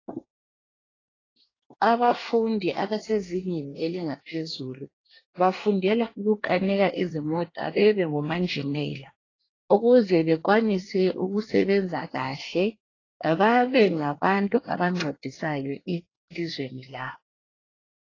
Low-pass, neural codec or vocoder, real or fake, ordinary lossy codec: 7.2 kHz; codec, 24 kHz, 1 kbps, SNAC; fake; AAC, 32 kbps